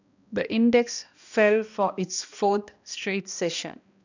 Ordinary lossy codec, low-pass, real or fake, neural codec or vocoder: none; 7.2 kHz; fake; codec, 16 kHz, 1 kbps, X-Codec, HuBERT features, trained on balanced general audio